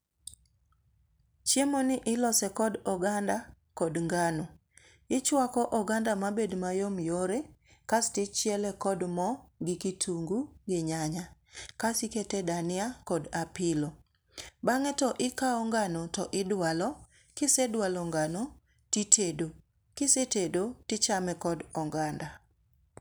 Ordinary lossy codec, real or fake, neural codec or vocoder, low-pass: none; real; none; none